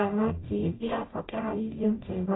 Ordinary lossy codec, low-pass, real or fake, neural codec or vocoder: AAC, 16 kbps; 7.2 kHz; fake; codec, 44.1 kHz, 0.9 kbps, DAC